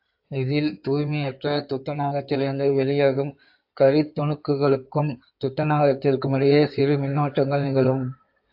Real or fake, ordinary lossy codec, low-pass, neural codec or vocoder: fake; AAC, 48 kbps; 5.4 kHz; codec, 16 kHz in and 24 kHz out, 2.2 kbps, FireRedTTS-2 codec